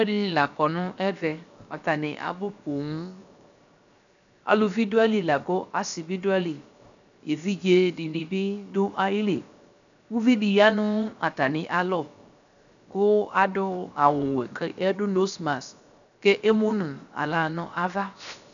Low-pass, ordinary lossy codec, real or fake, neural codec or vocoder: 7.2 kHz; MP3, 96 kbps; fake; codec, 16 kHz, 0.7 kbps, FocalCodec